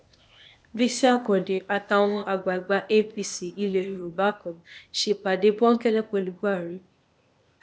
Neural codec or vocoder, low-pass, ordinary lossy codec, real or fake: codec, 16 kHz, 0.8 kbps, ZipCodec; none; none; fake